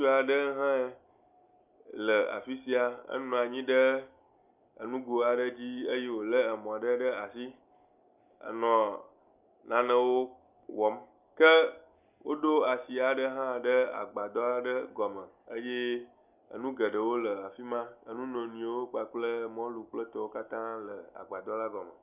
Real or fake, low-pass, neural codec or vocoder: real; 3.6 kHz; none